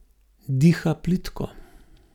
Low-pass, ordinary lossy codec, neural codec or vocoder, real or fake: 19.8 kHz; none; none; real